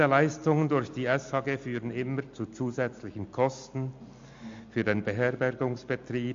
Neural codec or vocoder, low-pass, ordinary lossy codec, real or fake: none; 7.2 kHz; MP3, 48 kbps; real